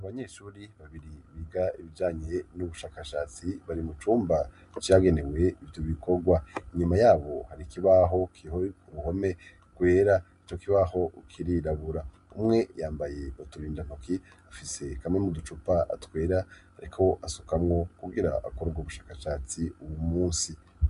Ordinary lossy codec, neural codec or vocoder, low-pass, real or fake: MP3, 64 kbps; none; 10.8 kHz; real